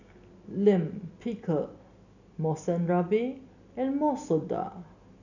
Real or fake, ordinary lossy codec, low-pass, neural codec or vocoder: real; none; 7.2 kHz; none